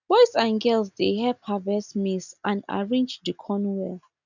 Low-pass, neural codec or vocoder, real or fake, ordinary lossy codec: 7.2 kHz; none; real; none